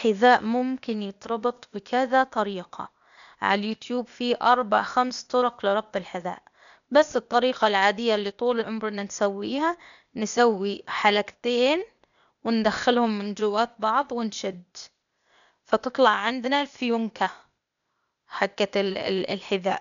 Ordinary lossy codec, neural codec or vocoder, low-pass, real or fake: none; codec, 16 kHz, 0.8 kbps, ZipCodec; 7.2 kHz; fake